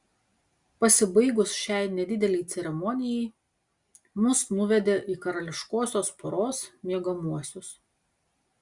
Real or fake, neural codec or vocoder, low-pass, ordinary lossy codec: real; none; 10.8 kHz; Opus, 64 kbps